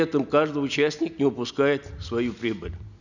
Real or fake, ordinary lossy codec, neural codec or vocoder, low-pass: real; none; none; 7.2 kHz